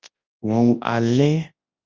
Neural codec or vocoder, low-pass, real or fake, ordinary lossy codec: codec, 24 kHz, 0.9 kbps, WavTokenizer, large speech release; 7.2 kHz; fake; Opus, 24 kbps